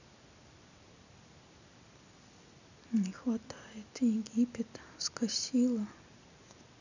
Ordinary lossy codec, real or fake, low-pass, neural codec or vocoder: none; real; 7.2 kHz; none